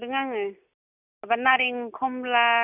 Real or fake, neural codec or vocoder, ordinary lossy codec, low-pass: real; none; none; 3.6 kHz